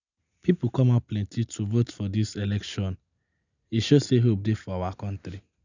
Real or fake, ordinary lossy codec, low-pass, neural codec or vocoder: real; none; 7.2 kHz; none